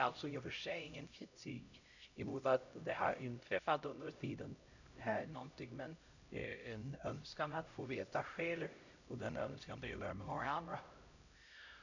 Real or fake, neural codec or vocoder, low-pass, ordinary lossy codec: fake; codec, 16 kHz, 0.5 kbps, X-Codec, HuBERT features, trained on LibriSpeech; 7.2 kHz; none